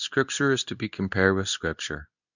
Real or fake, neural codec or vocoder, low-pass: fake; codec, 24 kHz, 0.9 kbps, WavTokenizer, medium speech release version 2; 7.2 kHz